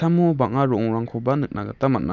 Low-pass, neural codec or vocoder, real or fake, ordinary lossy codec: 7.2 kHz; none; real; none